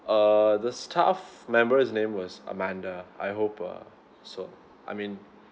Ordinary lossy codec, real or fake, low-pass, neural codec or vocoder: none; real; none; none